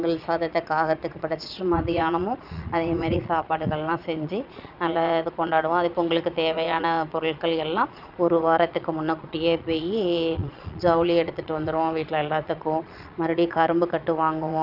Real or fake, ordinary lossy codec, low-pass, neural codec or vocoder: fake; none; 5.4 kHz; vocoder, 22.05 kHz, 80 mel bands, Vocos